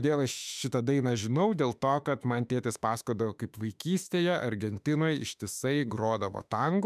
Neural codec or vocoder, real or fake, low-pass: autoencoder, 48 kHz, 32 numbers a frame, DAC-VAE, trained on Japanese speech; fake; 14.4 kHz